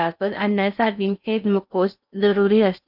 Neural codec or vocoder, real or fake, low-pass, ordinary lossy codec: codec, 16 kHz in and 24 kHz out, 0.6 kbps, FocalCodec, streaming, 4096 codes; fake; 5.4 kHz; none